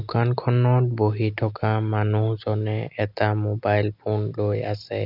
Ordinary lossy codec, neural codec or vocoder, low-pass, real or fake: none; none; 5.4 kHz; real